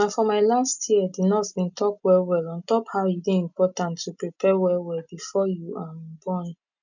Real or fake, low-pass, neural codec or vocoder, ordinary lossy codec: real; 7.2 kHz; none; none